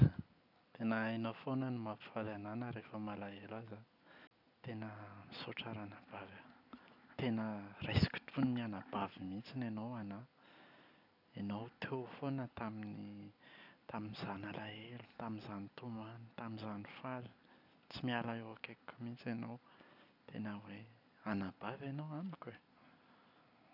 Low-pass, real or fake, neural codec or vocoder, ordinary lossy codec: 5.4 kHz; real; none; none